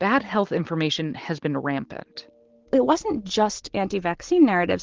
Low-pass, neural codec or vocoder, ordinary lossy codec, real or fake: 7.2 kHz; none; Opus, 16 kbps; real